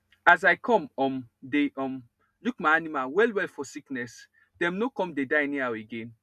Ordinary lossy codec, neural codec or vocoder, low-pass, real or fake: none; none; 14.4 kHz; real